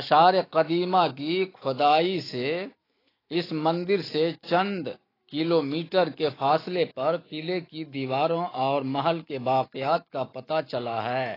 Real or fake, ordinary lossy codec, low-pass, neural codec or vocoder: fake; AAC, 24 kbps; 5.4 kHz; autoencoder, 48 kHz, 128 numbers a frame, DAC-VAE, trained on Japanese speech